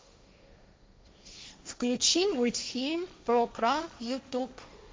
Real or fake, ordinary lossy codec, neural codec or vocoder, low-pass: fake; none; codec, 16 kHz, 1.1 kbps, Voila-Tokenizer; none